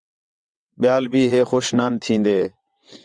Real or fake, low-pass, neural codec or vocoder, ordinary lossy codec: fake; 9.9 kHz; vocoder, 22.05 kHz, 80 mel bands, WaveNeXt; Opus, 64 kbps